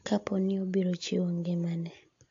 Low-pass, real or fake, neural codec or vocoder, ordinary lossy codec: 7.2 kHz; real; none; AAC, 48 kbps